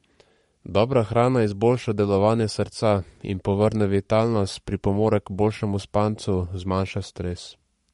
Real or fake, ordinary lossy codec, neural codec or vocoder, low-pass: fake; MP3, 48 kbps; codec, 44.1 kHz, 7.8 kbps, Pupu-Codec; 19.8 kHz